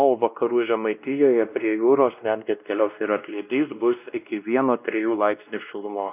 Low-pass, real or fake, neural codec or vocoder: 3.6 kHz; fake; codec, 16 kHz, 1 kbps, X-Codec, WavLM features, trained on Multilingual LibriSpeech